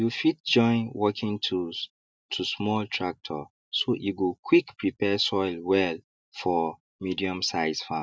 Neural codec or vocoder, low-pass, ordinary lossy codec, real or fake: none; none; none; real